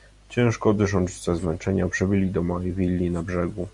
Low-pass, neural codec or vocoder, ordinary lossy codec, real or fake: 10.8 kHz; none; MP3, 64 kbps; real